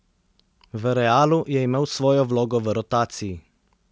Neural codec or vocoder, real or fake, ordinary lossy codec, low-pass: none; real; none; none